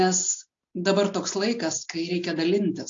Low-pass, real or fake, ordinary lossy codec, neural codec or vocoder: 7.2 kHz; real; AAC, 48 kbps; none